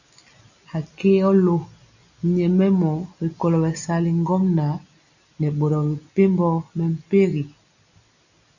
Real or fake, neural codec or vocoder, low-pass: real; none; 7.2 kHz